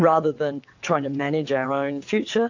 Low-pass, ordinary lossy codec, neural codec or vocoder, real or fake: 7.2 kHz; AAC, 48 kbps; vocoder, 22.05 kHz, 80 mel bands, WaveNeXt; fake